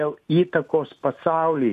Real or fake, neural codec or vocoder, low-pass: real; none; 14.4 kHz